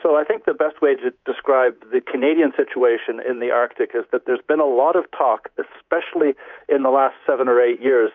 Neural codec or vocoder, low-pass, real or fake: codec, 24 kHz, 3.1 kbps, DualCodec; 7.2 kHz; fake